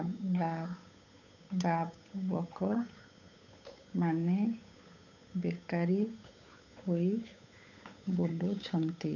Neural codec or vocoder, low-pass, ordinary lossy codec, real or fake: codec, 16 kHz, 8 kbps, FunCodec, trained on Chinese and English, 25 frames a second; 7.2 kHz; none; fake